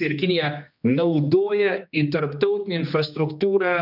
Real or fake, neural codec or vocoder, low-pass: fake; codec, 16 kHz, 2 kbps, X-Codec, HuBERT features, trained on general audio; 5.4 kHz